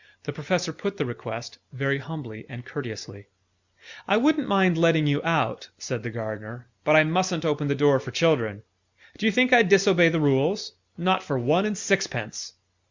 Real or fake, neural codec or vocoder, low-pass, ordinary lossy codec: real; none; 7.2 kHz; Opus, 64 kbps